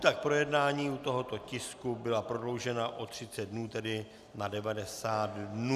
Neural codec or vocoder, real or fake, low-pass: none; real; 14.4 kHz